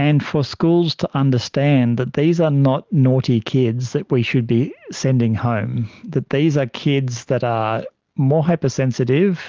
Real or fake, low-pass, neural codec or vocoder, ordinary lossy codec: real; 7.2 kHz; none; Opus, 32 kbps